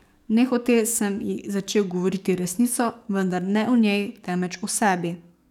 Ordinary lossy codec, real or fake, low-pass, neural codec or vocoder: none; fake; 19.8 kHz; codec, 44.1 kHz, 7.8 kbps, DAC